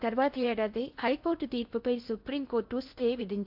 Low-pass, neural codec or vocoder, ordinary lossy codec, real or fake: 5.4 kHz; codec, 16 kHz in and 24 kHz out, 0.8 kbps, FocalCodec, streaming, 65536 codes; AAC, 48 kbps; fake